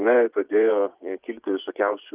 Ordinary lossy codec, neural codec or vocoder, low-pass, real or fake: Opus, 32 kbps; vocoder, 24 kHz, 100 mel bands, Vocos; 3.6 kHz; fake